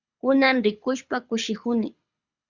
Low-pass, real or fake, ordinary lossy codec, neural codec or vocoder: 7.2 kHz; fake; Opus, 64 kbps; codec, 24 kHz, 6 kbps, HILCodec